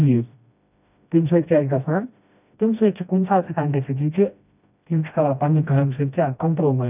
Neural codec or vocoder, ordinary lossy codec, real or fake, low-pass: codec, 16 kHz, 1 kbps, FreqCodec, smaller model; none; fake; 3.6 kHz